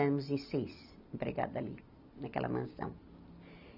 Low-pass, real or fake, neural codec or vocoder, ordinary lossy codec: 5.4 kHz; real; none; none